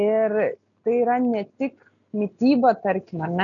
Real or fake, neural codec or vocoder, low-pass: real; none; 7.2 kHz